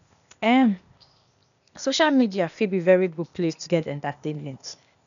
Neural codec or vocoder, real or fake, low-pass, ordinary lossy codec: codec, 16 kHz, 0.8 kbps, ZipCodec; fake; 7.2 kHz; none